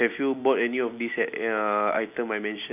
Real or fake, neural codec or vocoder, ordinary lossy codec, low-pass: real; none; none; 3.6 kHz